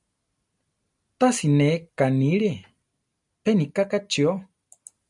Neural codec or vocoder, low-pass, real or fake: none; 10.8 kHz; real